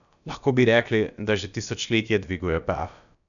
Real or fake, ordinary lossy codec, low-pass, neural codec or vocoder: fake; none; 7.2 kHz; codec, 16 kHz, about 1 kbps, DyCAST, with the encoder's durations